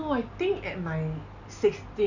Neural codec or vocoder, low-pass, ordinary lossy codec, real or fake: none; 7.2 kHz; none; real